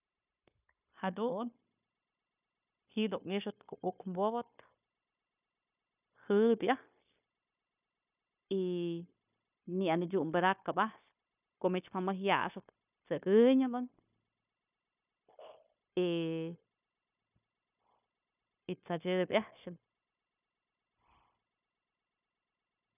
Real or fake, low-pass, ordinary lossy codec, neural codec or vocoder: fake; 3.6 kHz; none; codec, 16 kHz, 0.9 kbps, LongCat-Audio-Codec